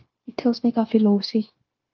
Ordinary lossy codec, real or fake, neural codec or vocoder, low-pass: Opus, 32 kbps; fake; codec, 16 kHz, 0.9 kbps, LongCat-Audio-Codec; 7.2 kHz